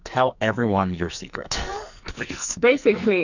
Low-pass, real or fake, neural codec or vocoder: 7.2 kHz; fake; codec, 44.1 kHz, 2.6 kbps, SNAC